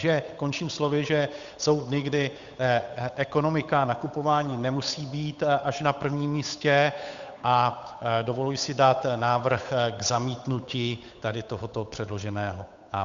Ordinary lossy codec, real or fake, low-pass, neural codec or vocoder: Opus, 64 kbps; fake; 7.2 kHz; codec, 16 kHz, 8 kbps, FunCodec, trained on Chinese and English, 25 frames a second